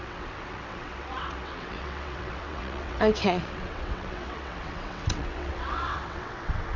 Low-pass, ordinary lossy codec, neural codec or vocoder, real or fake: 7.2 kHz; none; vocoder, 22.05 kHz, 80 mel bands, WaveNeXt; fake